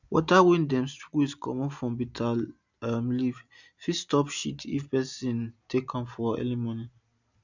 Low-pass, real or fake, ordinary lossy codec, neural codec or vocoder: 7.2 kHz; real; none; none